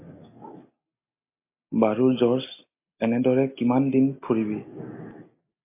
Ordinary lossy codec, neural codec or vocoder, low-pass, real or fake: MP3, 24 kbps; none; 3.6 kHz; real